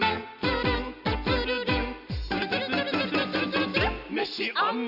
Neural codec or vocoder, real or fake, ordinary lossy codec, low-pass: none; real; none; 5.4 kHz